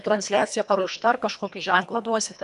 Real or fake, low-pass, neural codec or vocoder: fake; 10.8 kHz; codec, 24 kHz, 1.5 kbps, HILCodec